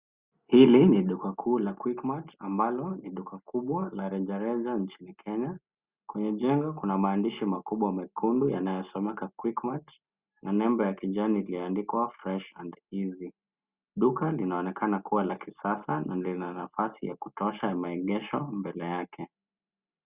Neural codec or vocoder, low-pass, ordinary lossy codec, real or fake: none; 3.6 kHz; Opus, 64 kbps; real